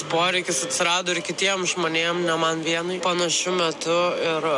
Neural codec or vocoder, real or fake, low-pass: none; real; 10.8 kHz